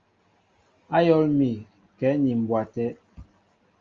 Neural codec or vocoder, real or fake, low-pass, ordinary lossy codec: none; real; 7.2 kHz; Opus, 32 kbps